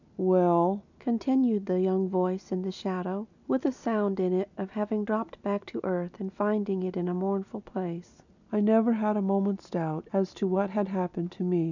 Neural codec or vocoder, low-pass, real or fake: none; 7.2 kHz; real